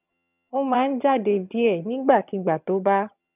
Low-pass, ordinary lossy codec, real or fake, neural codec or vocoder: 3.6 kHz; none; fake; vocoder, 22.05 kHz, 80 mel bands, HiFi-GAN